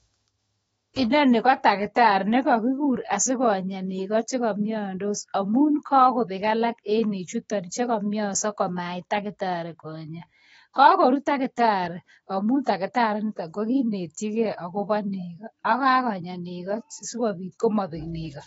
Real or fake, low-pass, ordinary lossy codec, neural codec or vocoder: fake; 19.8 kHz; AAC, 24 kbps; autoencoder, 48 kHz, 128 numbers a frame, DAC-VAE, trained on Japanese speech